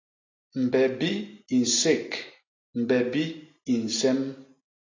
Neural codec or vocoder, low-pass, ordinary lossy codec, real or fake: none; 7.2 kHz; AAC, 32 kbps; real